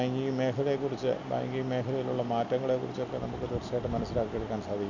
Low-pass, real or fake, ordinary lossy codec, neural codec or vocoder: 7.2 kHz; real; none; none